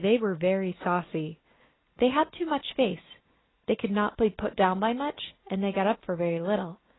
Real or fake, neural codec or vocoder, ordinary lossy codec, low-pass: real; none; AAC, 16 kbps; 7.2 kHz